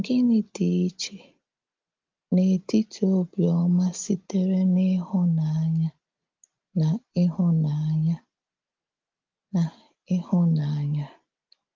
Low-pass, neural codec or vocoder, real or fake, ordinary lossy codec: 7.2 kHz; none; real; Opus, 24 kbps